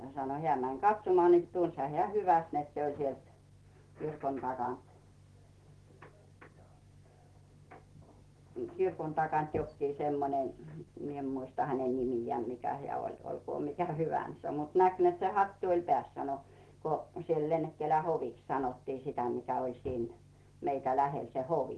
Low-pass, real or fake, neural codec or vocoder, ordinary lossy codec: 10.8 kHz; real; none; Opus, 16 kbps